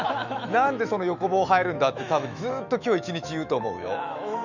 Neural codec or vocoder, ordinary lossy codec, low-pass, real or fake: autoencoder, 48 kHz, 128 numbers a frame, DAC-VAE, trained on Japanese speech; none; 7.2 kHz; fake